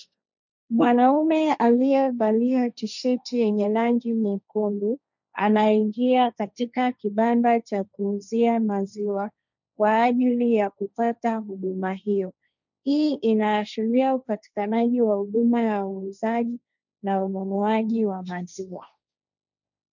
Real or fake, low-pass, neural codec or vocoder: fake; 7.2 kHz; codec, 16 kHz, 1.1 kbps, Voila-Tokenizer